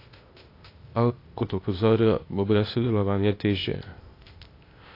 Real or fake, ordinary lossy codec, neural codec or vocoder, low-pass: fake; AAC, 32 kbps; codec, 16 kHz, 0.8 kbps, ZipCodec; 5.4 kHz